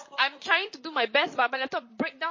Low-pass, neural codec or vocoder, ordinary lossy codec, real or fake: 7.2 kHz; vocoder, 44.1 kHz, 128 mel bands, Pupu-Vocoder; MP3, 32 kbps; fake